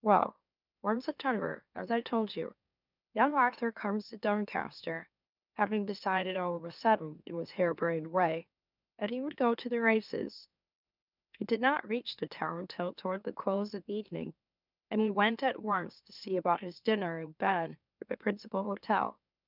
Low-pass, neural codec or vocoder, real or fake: 5.4 kHz; autoencoder, 44.1 kHz, a latent of 192 numbers a frame, MeloTTS; fake